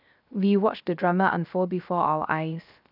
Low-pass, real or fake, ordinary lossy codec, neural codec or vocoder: 5.4 kHz; fake; none; codec, 16 kHz, 0.3 kbps, FocalCodec